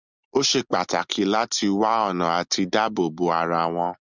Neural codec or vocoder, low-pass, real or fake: none; 7.2 kHz; real